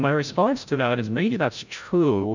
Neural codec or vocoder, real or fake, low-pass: codec, 16 kHz, 0.5 kbps, FreqCodec, larger model; fake; 7.2 kHz